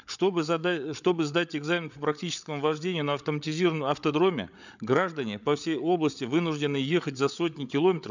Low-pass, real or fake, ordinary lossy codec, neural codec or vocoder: 7.2 kHz; fake; none; codec, 16 kHz, 8 kbps, FreqCodec, larger model